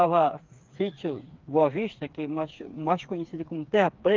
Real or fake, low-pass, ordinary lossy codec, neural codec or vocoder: fake; 7.2 kHz; Opus, 32 kbps; codec, 16 kHz, 4 kbps, FreqCodec, smaller model